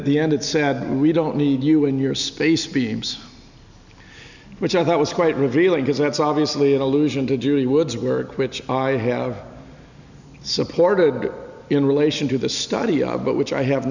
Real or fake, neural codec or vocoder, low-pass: real; none; 7.2 kHz